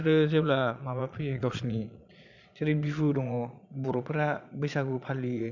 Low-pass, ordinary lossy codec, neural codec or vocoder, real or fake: 7.2 kHz; none; vocoder, 22.05 kHz, 80 mel bands, Vocos; fake